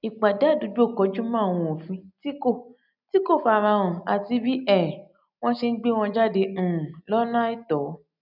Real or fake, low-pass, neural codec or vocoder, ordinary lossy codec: real; 5.4 kHz; none; none